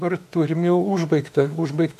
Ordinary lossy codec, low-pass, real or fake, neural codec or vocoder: AAC, 96 kbps; 14.4 kHz; fake; autoencoder, 48 kHz, 32 numbers a frame, DAC-VAE, trained on Japanese speech